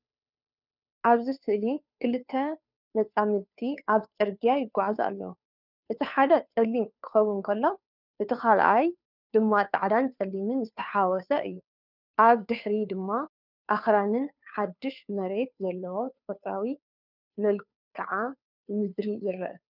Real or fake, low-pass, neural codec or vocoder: fake; 5.4 kHz; codec, 16 kHz, 2 kbps, FunCodec, trained on Chinese and English, 25 frames a second